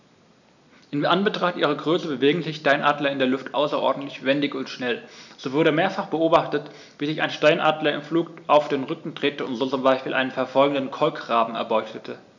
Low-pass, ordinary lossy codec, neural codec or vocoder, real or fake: 7.2 kHz; none; none; real